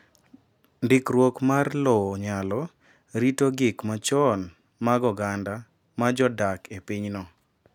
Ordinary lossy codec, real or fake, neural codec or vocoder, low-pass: none; real; none; 19.8 kHz